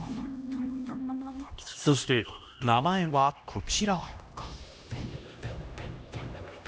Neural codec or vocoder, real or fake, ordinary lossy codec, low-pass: codec, 16 kHz, 1 kbps, X-Codec, HuBERT features, trained on LibriSpeech; fake; none; none